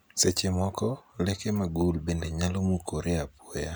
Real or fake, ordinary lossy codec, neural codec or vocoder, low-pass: real; none; none; none